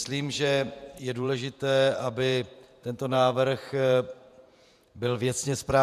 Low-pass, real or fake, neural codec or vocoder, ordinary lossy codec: 14.4 kHz; fake; vocoder, 48 kHz, 128 mel bands, Vocos; MP3, 96 kbps